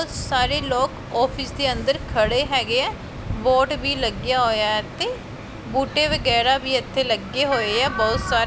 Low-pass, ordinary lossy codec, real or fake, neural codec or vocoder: none; none; real; none